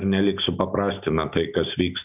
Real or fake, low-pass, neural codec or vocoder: real; 3.6 kHz; none